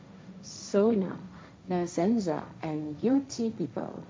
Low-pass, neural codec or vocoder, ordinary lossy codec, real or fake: none; codec, 16 kHz, 1.1 kbps, Voila-Tokenizer; none; fake